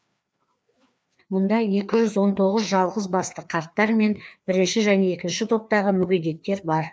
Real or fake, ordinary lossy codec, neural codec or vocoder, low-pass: fake; none; codec, 16 kHz, 2 kbps, FreqCodec, larger model; none